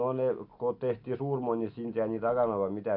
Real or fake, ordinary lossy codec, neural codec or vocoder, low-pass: real; AAC, 48 kbps; none; 5.4 kHz